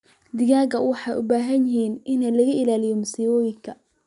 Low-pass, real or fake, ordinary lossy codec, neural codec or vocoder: 10.8 kHz; real; none; none